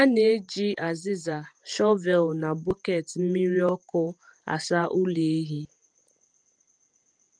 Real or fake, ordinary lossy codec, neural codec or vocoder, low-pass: fake; Opus, 32 kbps; vocoder, 48 kHz, 128 mel bands, Vocos; 9.9 kHz